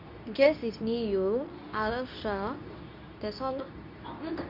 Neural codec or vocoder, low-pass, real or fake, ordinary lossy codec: codec, 24 kHz, 0.9 kbps, WavTokenizer, medium speech release version 2; 5.4 kHz; fake; none